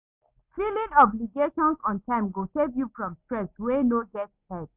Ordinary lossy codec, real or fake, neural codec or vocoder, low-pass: Opus, 64 kbps; real; none; 3.6 kHz